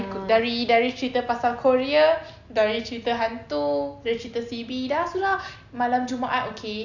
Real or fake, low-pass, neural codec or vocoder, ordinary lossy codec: real; 7.2 kHz; none; none